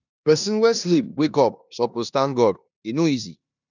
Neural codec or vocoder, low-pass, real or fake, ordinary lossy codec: codec, 16 kHz in and 24 kHz out, 0.9 kbps, LongCat-Audio-Codec, four codebook decoder; 7.2 kHz; fake; none